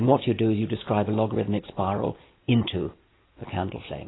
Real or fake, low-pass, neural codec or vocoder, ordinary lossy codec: real; 7.2 kHz; none; AAC, 16 kbps